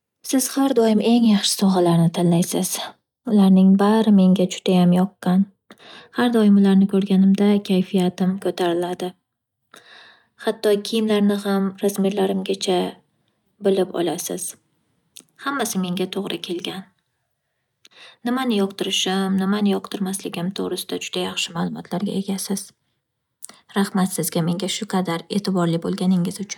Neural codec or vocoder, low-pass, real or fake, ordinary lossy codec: none; 19.8 kHz; real; none